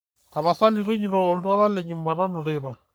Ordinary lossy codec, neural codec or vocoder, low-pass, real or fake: none; codec, 44.1 kHz, 3.4 kbps, Pupu-Codec; none; fake